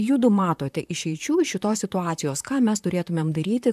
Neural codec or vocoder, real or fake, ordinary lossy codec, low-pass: vocoder, 44.1 kHz, 128 mel bands, Pupu-Vocoder; fake; AAC, 96 kbps; 14.4 kHz